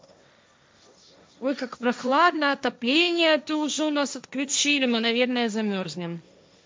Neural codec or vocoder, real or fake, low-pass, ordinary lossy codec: codec, 16 kHz, 1.1 kbps, Voila-Tokenizer; fake; none; none